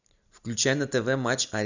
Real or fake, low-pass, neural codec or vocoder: real; 7.2 kHz; none